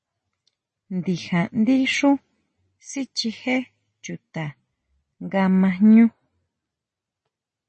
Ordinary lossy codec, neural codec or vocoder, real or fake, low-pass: MP3, 32 kbps; none; real; 10.8 kHz